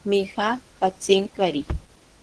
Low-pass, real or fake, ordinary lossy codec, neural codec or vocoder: 10.8 kHz; fake; Opus, 16 kbps; codec, 24 kHz, 0.9 kbps, WavTokenizer, medium speech release version 1